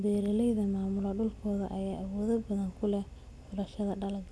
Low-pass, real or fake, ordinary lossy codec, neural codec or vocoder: none; real; none; none